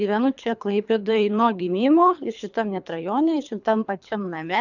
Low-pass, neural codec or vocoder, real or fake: 7.2 kHz; codec, 24 kHz, 3 kbps, HILCodec; fake